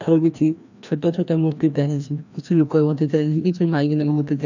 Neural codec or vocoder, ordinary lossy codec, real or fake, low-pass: codec, 16 kHz, 1 kbps, FreqCodec, larger model; none; fake; 7.2 kHz